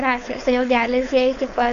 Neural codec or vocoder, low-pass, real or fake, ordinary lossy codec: codec, 16 kHz, 4.8 kbps, FACodec; 7.2 kHz; fake; MP3, 64 kbps